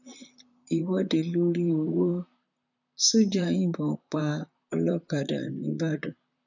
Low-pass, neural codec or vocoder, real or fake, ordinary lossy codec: 7.2 kHz; vocoder, 44.1 kHz, 128 mel bands, Pupu-Vocoder; fake; none